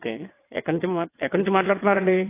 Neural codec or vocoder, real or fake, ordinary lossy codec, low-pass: vocoder, 22.05 kHz, 80 mel bands, WaveNeXt; fake; AAC, 24 kbps; 3.6 kHz